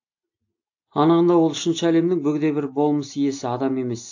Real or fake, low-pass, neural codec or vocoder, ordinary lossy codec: real; 7.2 kHz; none; AAC, 48 kbps